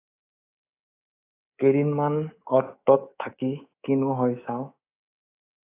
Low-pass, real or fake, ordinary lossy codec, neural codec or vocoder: 3.6 kHz; fake; AAC, 24 kbps; codec, 24 kHz, 3.1 kbps, DualCodec